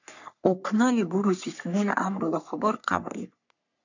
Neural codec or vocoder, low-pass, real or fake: codec, 44.1 kHz, 3.4 kbps, Pupu-Codec; 7.2 kHz; fake